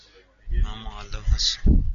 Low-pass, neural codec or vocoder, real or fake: 7.2 kHz; none; real